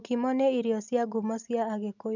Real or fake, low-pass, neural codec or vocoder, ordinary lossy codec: real; 7.2 kHz; none; none